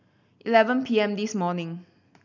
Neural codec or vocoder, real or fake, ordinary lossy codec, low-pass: none; real; none; 7.2 kHz